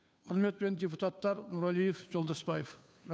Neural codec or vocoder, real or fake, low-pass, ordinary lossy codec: codec, 16 kHz, 2 kbps, FunCodec, trained on Chinese and English, 25 frames a second; fake; none; none